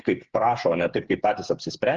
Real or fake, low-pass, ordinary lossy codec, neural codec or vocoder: fake; 7.2 kHz; Opus, 16 kbps; codec, 16 kHz, 8 kbps, FreqCodec, smaller model